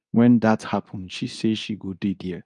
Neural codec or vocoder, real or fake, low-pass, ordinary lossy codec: codec, 24 kHz, 0.9 kbps, WavTokenizer, medium speech release version 2; fake; 10.8 kHz; none